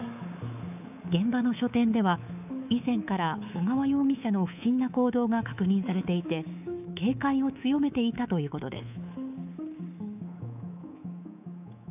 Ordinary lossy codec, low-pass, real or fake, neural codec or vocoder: none; 3.6 kHz; fake; codec, 24 kHz, 3.1 kbps, DualCodec